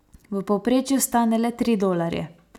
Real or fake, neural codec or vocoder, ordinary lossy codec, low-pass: real; none; none; 19.8 kHz